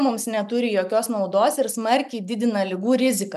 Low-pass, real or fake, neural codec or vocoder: 14.4 kHz; real; none